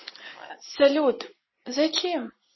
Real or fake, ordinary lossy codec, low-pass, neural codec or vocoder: real; MP3, 24 kbps; 7.2 kHz; none